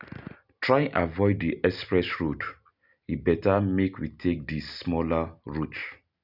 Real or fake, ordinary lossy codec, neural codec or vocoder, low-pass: real; none; none; 5.4 kHz